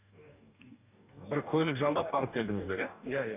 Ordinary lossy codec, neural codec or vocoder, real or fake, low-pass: none; codec, 44.1 kHz, 2.6 kbps, DAC; fake; 3.6 kHz